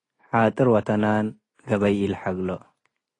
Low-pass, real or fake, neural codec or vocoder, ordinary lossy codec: 10.8 kHz; real; none; AAC, 32 kbps